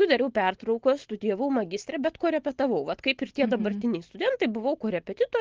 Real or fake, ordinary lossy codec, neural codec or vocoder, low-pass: real; Opus, 16 kbps; none; 7.2 kHz